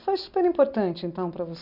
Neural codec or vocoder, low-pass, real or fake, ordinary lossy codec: none; 5.4 kHz; real; none